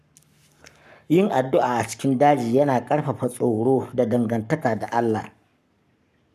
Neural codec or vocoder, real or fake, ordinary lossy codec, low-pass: codec, 44.1 kHz, 7.8 kbps, Pupu-Codec; fake; none; 14.4 kHz